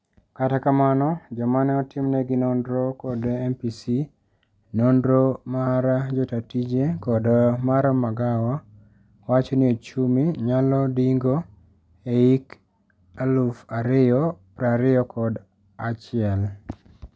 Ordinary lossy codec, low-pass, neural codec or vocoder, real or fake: none; none; none; real